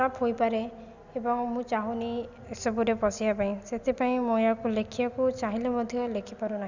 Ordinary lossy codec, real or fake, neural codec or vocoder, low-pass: none; real; none; 7.2 kHz